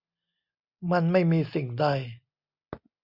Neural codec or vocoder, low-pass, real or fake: none; 5.4 kHz; real